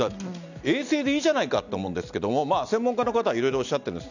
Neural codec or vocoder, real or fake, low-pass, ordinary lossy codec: none; real; 7.2 kHz; none